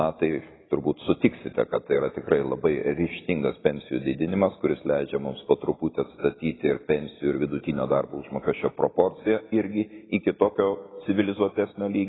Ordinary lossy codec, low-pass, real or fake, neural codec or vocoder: AAC, 16 kbps; 7.2 kHz; real; none